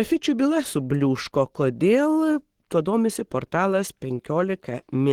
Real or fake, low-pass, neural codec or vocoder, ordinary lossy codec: fake; 19.8 kHz; codec, 44.1 kHz, 7.8 kbps, Pupu-Codec; Opus, 16 kbps